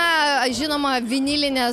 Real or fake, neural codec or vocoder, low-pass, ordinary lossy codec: real; none; 14.4 kHz; MP3, 96 kbps